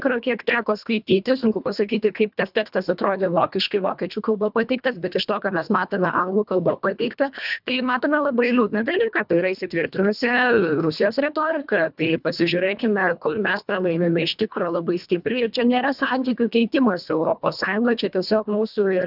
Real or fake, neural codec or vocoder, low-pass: fake; codec, 24 kHz, 1.5 kbps, HILCodec; 5.4 kHz